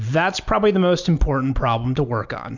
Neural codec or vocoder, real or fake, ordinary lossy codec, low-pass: none; real; MP3, 64 kbps; 7.2 kHz